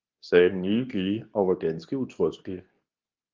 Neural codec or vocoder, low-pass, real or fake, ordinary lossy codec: codec, 24 kHz, 0.9 kbps, WavTokenizer, medium speech release version 2; 7.2 kHz; fake; Opus, 32 kbps